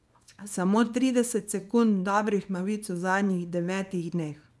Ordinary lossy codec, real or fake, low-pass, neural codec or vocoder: none; fake; none; codec, 24 kHz, 0.9 kbps, WavTokenizer, small release